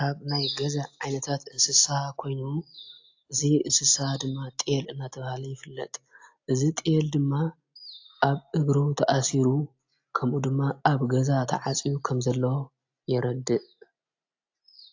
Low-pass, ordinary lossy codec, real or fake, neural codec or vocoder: 7.2 kHz; AAC, 48 kbps; real; none